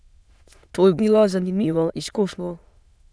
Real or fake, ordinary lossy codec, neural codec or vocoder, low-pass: fake; none; autoencoder, 22.05 kHz, a latent of 192 numbers a frame, VITS, trained on many speakers; none